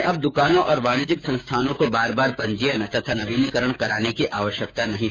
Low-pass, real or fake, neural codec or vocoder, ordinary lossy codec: none; fake; codec, 16 kHz, 6 kbps, DAC; none